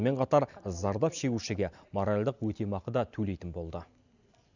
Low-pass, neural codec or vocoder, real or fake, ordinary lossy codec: 7.2 kHz; none; real; none